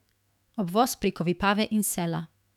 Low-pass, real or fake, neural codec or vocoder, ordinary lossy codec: 19.8 kHz; fake; autoencoder, 48 kHz, 128 numbers a frame, DAC-VAE, trained on Japanese speech; none